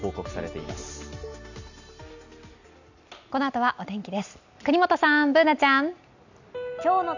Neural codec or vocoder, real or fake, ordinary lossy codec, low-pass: none; real; none; 7.2 kHz